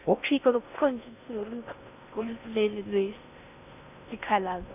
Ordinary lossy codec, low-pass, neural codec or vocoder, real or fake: none; 3.6 kHz; codec, 16 kHz in and 24 kHz out, 0.6 kbps, FocalCodec, streaming, 4096 codes; fake